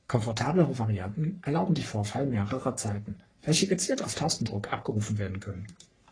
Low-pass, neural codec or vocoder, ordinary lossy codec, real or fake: 9.9 kHz; codec, 44.1 kHz, 3.4 kbps, Pupu-Codec; AAC, 32 kbps; fake